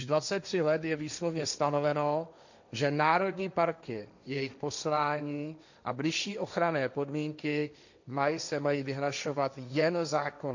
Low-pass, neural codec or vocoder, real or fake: 7.2 kHz; codec, 16 kHz, 1.1 kbps, Voila-Tokenizer; fake